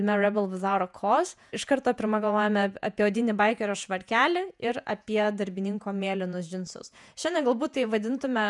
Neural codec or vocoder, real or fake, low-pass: vocoder, 48 kHz, 128 mel bands, Vocos; fake; 10.8 kHz